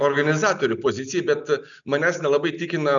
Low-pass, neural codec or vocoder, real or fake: 7.2 kHz; none; real